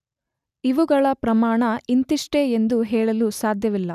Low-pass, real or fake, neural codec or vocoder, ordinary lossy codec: 14.4 kHz; real; none; none